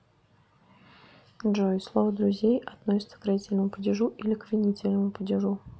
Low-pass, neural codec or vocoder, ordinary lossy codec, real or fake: none; none; none; real